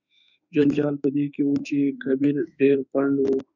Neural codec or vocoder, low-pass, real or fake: autoencoder, 48 kHz, 32 numbers a frame, DAC-VAE, trained on Japanese speech; 7.2 kHz; fake